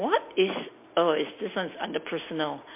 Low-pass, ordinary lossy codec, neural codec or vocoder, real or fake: 3.6 kHz; MP3, 24 kbps; none; real